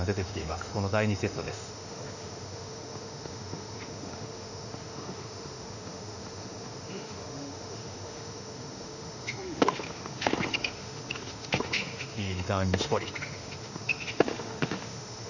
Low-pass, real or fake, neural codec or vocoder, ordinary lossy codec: 7.2 kHz; fake; autoencoder, 48 kHz, 32 numbers a frame, DAC-VAE, trained on Japanese speech; none